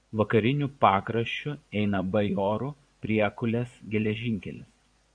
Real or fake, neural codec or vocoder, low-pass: fake; vocoder, 24 kHz, 100 mel bands, Vocos; 9.9 kHz